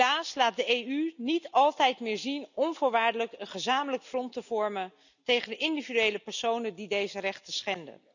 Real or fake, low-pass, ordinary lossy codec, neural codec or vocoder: real; 7.2 kHz; none; none